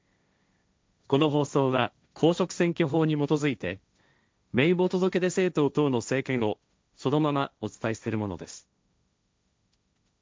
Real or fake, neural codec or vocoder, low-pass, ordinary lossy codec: fake; codec, 16 kHz, 1.1 kbps, Voila-Tokenizer; none; none